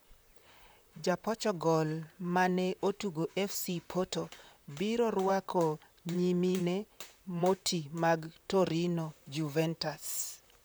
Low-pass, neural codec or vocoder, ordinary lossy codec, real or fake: none; vocoder, 44.1 kHz, 128 mel bands, Pupu-Vocoder; none; fake